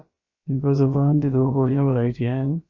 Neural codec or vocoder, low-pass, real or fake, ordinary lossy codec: codec, 16 kHz, about 1 kbps, DyCAST, with the encoder's durations; 7.2 kHz; fake; MP3, 32 kbps